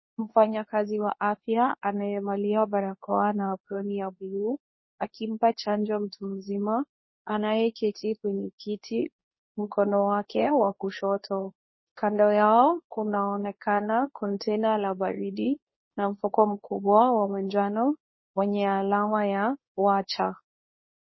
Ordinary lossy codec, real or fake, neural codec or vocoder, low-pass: MP3, 24 kbps; fake; codec, 24 kHz, 0.9 kbps, WavTokenizer, medium speech release version 2; 7.2 kHz